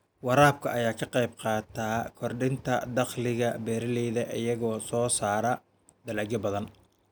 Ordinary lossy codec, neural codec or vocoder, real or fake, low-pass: none; none; real; none